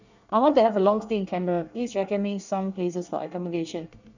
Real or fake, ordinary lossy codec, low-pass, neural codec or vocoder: fake; none; 7.2 kHz; codec, 24 kHz, 1 kbps, SNAC